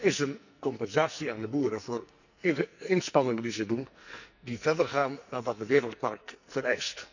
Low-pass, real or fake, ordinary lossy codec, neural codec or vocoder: 7.2 kHz; fake; none; codec, 44.1 kHz, 2.6 kbps, SNAC